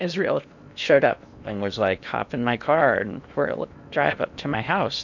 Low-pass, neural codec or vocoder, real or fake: 7.2 kHz; codec, 16 kHz in and 24 kHz out, 0.8 kbps, FocalCodec, streaming, 65536 codes; fake